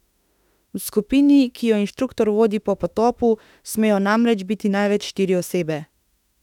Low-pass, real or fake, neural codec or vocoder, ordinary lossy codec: 19.8 kHz; fake; autoencoder, 48 kHz, 32 numbers a frame, DAC-VAE, trained on Japanese speech; none